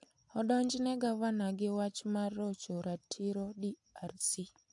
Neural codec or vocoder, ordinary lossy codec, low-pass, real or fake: none; AAC, 64 kbps; 10.8 kHz; real